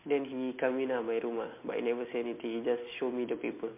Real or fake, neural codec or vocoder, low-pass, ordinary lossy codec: real; none; 3.6 kHz; MP3, 24 kbps